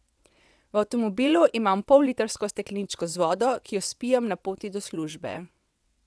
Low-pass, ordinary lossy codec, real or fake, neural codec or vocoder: none; none; fake; vocoder, 22.05 kHz, 80 mel bands, WaveNeXt